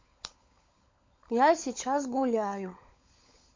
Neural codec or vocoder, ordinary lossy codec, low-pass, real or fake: codec, 16 kHz, 16 kbps, FunCodec, trained on LibriTTS, 50 frames a second; MP3, 48 kbps; 7.2 kHz; fake